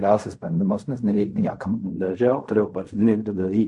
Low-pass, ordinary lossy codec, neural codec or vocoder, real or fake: 10.8 kHz; MP3, 48 kbps; codec, 16 kHz in and 24 kHz out, 0.4 kbps, LongCat-Audio-Codec, fine tuned four codebook decoder; fake